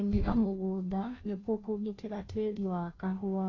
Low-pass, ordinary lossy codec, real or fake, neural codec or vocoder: 7.2 kHz; AAC, 32 kbps; fake; codec, 16 kHz, 0.5 kbps, FunCodec, trained on Chinese and English, 25 frames a second